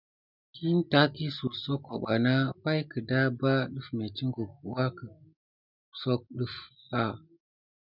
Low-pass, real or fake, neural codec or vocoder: 5.4 kHz; real; none